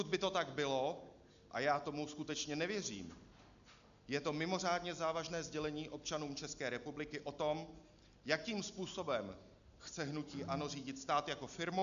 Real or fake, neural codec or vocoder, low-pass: real; none; 7.2 kHz